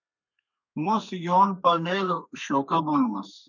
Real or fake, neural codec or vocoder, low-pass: fake; codec, 32 kHz, 1.9 kbps, SNAC; 7.2 kHz